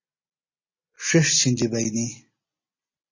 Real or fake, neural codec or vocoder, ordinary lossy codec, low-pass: real; none; MP3, 32 kbps; 7.2 kHz